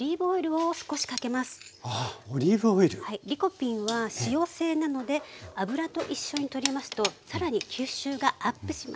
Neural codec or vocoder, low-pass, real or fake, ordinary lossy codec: none; none; real; none